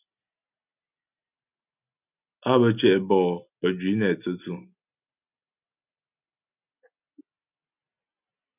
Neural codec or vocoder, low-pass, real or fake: none; 3.6 kHz; real